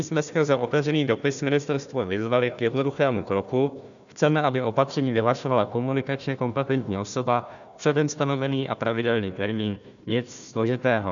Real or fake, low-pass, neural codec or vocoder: fake; 7.2 kHz; codec, 16 kHz, 1 kbps, FunCodec, trained on Chinese and English, 50 frames a second